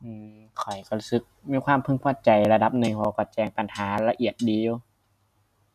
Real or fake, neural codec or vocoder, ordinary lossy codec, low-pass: real; none; none; 14.4 kHz